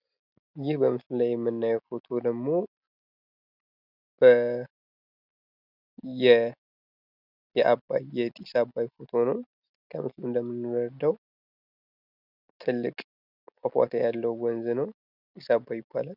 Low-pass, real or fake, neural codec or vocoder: 5.4 kHz; real; none